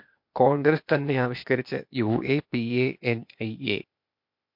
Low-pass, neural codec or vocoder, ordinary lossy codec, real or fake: 5.4 kHz; codec, 16 kHz, 0.8 kbps, ZipCodec; MP3, 48 kbps; fake